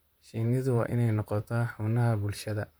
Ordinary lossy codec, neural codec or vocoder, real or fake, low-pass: none; vocoder, 44.1 kHz, 128 mel bands, Pupu-Vocoder; fake; none